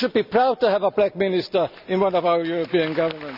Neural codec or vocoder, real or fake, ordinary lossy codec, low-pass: none; real; none; 5.4 kHz